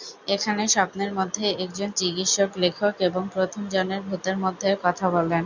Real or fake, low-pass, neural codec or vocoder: real; 7.2 kHz; none